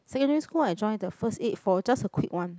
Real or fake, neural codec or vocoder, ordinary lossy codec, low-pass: real; none; none; none